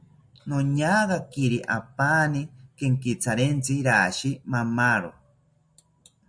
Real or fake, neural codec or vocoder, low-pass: real; none; 9.9 kHz